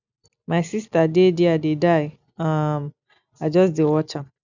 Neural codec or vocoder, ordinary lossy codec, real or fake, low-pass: none; none; real; 7.2 kHz